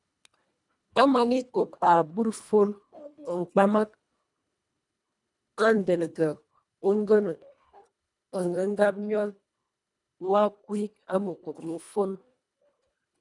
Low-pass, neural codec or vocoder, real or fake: 10.8 kHz; codec, 24 kHz, 1.5 kbps, HILCodec; fake